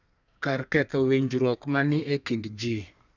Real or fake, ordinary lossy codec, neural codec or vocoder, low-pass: fake; none; codec, 32 kHz, 1.9 kbps, SNAC; 7.2 kHz